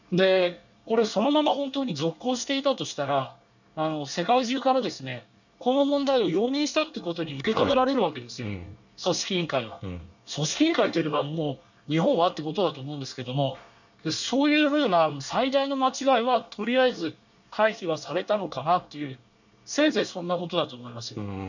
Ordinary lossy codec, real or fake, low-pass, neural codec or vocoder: none; fake; 7.2 kHz; codec, 24 kHz, 1 kbps, SNAC